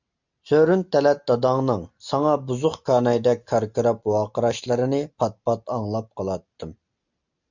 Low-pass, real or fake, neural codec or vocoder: 7.2 kHz; real; none